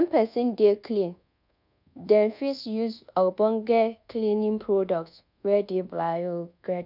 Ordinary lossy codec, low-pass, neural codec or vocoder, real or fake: none; 5.4 kHz; codec, 24 kHz, 1.2 kbps, DualCodec; fake